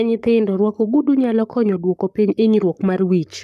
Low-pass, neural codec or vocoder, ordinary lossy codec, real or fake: 14.4 kHz; codec, 44.1 kHz, 7.8 kbps, Pupu-Codec; none; fake